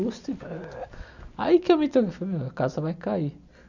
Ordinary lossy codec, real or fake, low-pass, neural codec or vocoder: none; real; 7.2 kHz; none